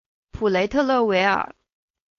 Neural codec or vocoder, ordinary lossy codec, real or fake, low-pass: codec, 16 kHz, 4.8 kbps, FACodec; AAC, 48 kbps; fake; 7.2 kHz